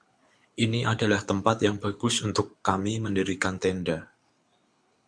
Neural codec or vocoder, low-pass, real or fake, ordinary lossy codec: codec, 24 kHz, 6 kbps, HILCodec; 9.9 kHz; fake; MP3, 64 kbps